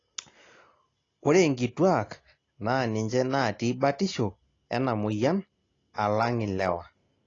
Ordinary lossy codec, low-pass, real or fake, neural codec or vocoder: AAC, 32 kbps; 7.2 kHz; real; none